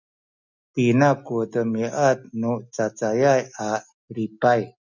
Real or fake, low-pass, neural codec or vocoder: real; 7.2 kHz; none